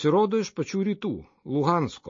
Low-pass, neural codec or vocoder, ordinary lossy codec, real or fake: 7.2 kHz; none; MP3, 32 kbps; real